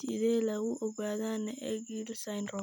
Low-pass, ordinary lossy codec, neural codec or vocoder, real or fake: none; none; none; real